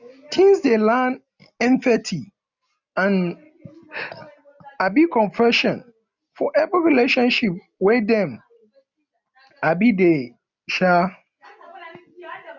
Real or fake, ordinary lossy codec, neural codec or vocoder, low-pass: real; none; none; 7.2 kHz